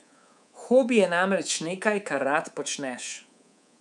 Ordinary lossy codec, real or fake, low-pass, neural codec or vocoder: none; fake; 10.8 kHz; codec, 24 kHz, 3.1 kbps, DualCodec